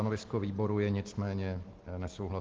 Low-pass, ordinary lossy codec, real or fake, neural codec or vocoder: 7.2 kHz; Opus, 16 kbps; real; none